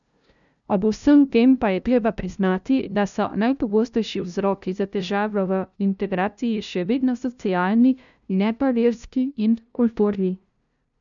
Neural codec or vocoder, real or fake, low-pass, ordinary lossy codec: codec, 16 kHz, 0.5 kbps, FunCodec, trained on LibriTTS, 25 frames a second; fake; 7.2 kHz; none